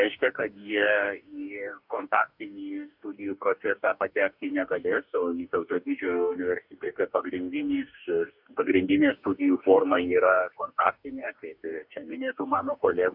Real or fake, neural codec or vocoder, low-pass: fake; codec, 44.1 kHz, 2.6 kbps, DAC; 5.4 kHz